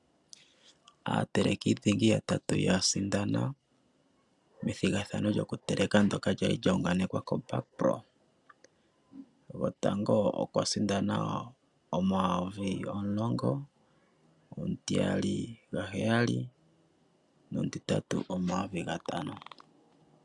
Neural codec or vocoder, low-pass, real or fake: none; 10.8 kHz; real